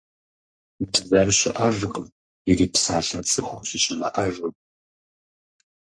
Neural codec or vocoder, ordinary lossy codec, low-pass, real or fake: codec, 44.1 kHz, 3.4 kbps, Pupu-Codec; MP3, 48 kbps; 9.9 kHz; fake